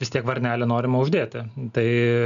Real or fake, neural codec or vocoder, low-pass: real; none; 7.2 kHz